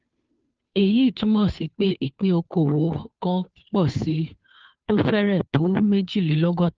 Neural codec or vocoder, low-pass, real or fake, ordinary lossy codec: codec, 16 kHz, 2 kbps, FunCodec, trained on Chinese and English, 25 frames a second; 7.2 kHz; fake; Opus, 24 kbps